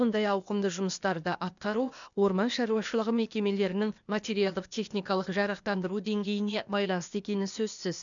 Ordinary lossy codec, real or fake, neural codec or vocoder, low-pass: none; fake; codec, 16 kHz, 0.8 kbps, ZipCodec; 7.2 kHz